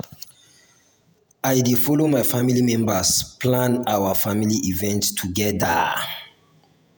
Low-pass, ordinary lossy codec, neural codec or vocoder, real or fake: none; none; vocoder, 48 kHz, 128 mel bands, Vocos; fake